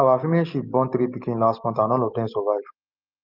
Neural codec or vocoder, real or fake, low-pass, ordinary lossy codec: none; real; 5.4 kHz; Opus, 24 kbps